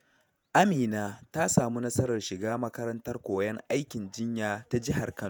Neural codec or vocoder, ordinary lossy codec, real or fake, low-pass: vocoder, 48 kHz, 128 mel bands, Vocos; none; fake; none